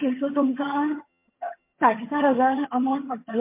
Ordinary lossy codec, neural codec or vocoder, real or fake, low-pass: MP3, 24 kbps; vocoder, 22.05 kHz, 80 mel bands, HiFi-GAN; fake; 3.6 kHz